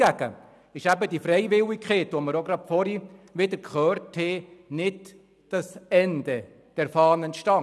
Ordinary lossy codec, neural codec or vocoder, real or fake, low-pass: none; none; real; none